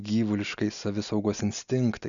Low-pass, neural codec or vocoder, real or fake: 7.2 kHz; none; real